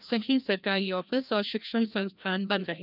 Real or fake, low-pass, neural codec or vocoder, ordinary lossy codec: fake; 5.4 kHz; codec, 16 kHz, 1 kbps, FreqCodec, larger model; none